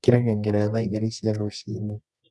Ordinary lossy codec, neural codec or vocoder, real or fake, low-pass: none; codec, 24 kHz, 0.9 kbps, WavTokenizer, medium music audio release; fake; none